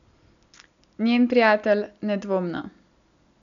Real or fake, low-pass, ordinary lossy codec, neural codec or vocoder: real; 7.2 kHz; none; none